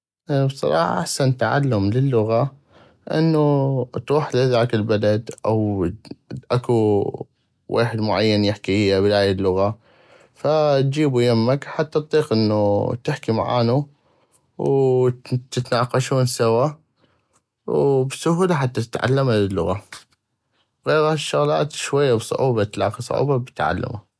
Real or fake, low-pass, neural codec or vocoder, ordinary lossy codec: real; none; none; none